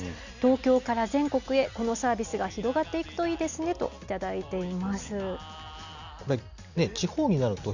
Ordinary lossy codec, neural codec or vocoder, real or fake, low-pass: none; none; real; 7.2 kHz